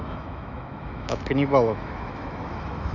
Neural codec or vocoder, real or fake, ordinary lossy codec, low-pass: autoencoder, 48 kHz, 128 numbers a frame, DAC-VAE, trained on Japanese speech; fake; none; 7.2 kHz